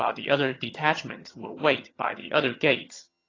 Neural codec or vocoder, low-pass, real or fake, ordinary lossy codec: vocoder, 22.05 kHz, 80 mel bands, HiFi-GAN; 7.2 kHz; fake; AAC, 32 kbps